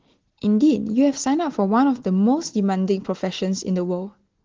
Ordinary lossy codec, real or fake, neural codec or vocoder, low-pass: Opus, 16 kbps; real; none; 7.2 kHz